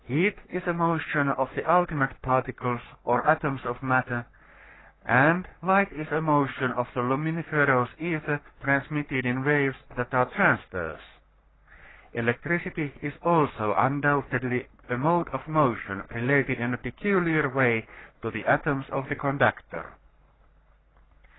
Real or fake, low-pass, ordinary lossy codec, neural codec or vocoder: fake; 7.2 kHz; AAC, 16 kbps; codec, 44.1 kHz, 3.4 kbps, Pupu-Codec